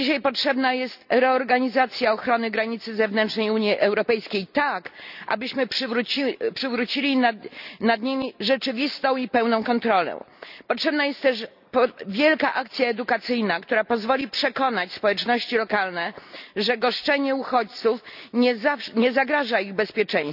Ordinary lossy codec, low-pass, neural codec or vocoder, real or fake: none; 5.4 kHz; none; real